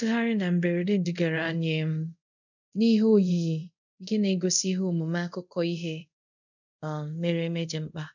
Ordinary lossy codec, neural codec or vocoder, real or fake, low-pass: none; codec, 24 kHz, 0.5 kbps, DualCodec; fake; 7.2 kHz